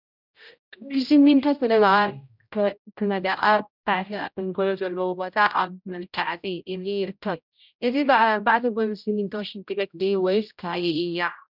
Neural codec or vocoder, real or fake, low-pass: codec, 16 kHz, 0.5 kbps, X-Codec, HuBERT features, trained on general audio; fake; 5.4 kHz